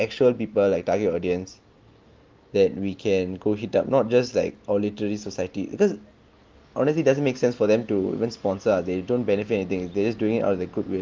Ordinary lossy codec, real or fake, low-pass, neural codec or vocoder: Opus, 24 kbps; real; 7.2 kHz; none